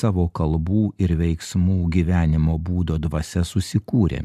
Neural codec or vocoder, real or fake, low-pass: none; real; 14.4 kHz